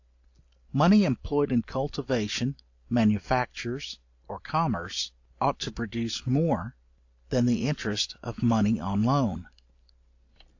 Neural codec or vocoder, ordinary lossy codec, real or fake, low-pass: none; AAC, 48 kbps; real; 7.2 kHz